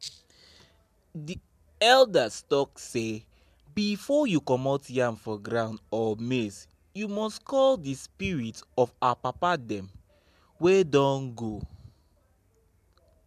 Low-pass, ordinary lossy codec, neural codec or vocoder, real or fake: 14.4 kHz; MP3, 96 kbps; none; real